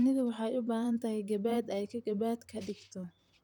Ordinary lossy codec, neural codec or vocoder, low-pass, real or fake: none; vocoder, 44.1 kHz, 128 mel bands, Pupu-Vocoder; 19.8 kHz; fake